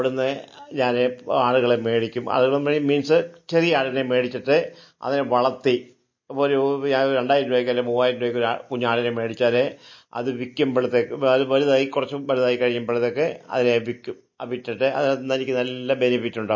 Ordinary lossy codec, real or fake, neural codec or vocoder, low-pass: MP3, 32 kbps; real; none; 7.2 kHz